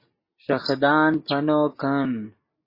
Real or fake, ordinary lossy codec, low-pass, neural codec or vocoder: real; MP3, 24 kbps; 5.4 kHz; none